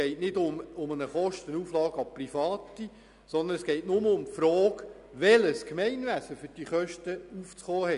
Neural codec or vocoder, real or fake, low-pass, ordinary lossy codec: none; real; 10.8 kHz; none